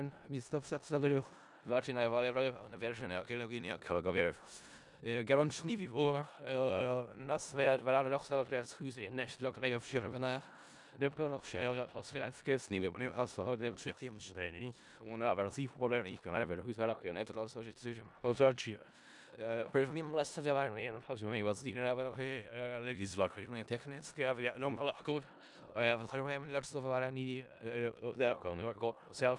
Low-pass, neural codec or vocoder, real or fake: 10.8 kHz; codec, 16 kHz in and 24 kHz out, 0.4 kbps, LongCat-Audio-Codec, four codebook decoder; fake